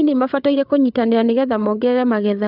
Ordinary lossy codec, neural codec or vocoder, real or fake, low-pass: none; codec, 16 kHz, 8 kbps, FreqCodec, larger model; fake; 5.4 kHz